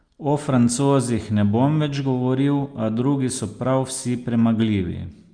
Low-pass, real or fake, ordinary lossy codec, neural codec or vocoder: 9.9 kHz; real; Opus, 32 kbps; none